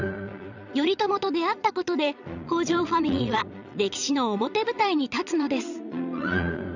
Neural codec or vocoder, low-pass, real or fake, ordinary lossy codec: vocoder, 22.05 kHz, 80 mel bands, Vocos; 7.2 kHz; fake; none